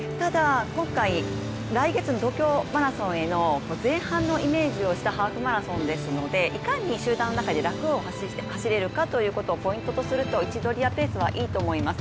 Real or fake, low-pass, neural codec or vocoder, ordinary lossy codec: real; none; none; none